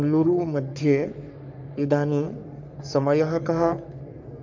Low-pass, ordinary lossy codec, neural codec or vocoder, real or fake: 7.2 kHz; none; codec, 44.1 kHz, 3.4 kbps, Pupu-Codec; fake